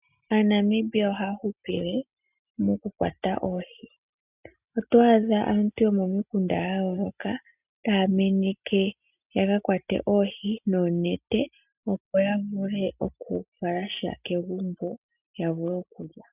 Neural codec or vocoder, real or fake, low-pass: none; real; 3.6 kHz